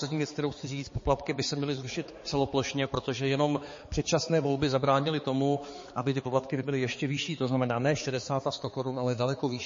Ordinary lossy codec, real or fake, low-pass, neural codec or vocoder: MP3, 32 kbps; fake; 7.2 kHz; codec, 16 kHz, 4 kbps, X-Codec, HuBERT features, trained on balanced general audio